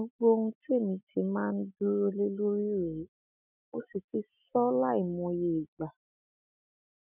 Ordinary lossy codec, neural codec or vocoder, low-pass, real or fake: none; none; 3.6 kHz; real